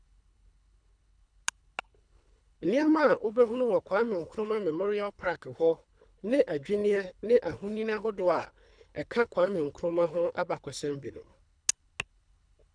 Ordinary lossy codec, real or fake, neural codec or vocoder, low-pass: none; fake; codec, 24 kHz, 3 kbps, HILCodec; 9.9 kHz